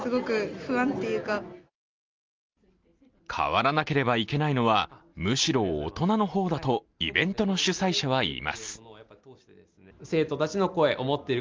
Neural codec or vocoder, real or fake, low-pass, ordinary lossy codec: none; real; 7.2 kHz; Opus, 32 kbps